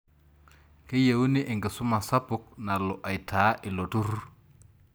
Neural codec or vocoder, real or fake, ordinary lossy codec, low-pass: none; real; none; none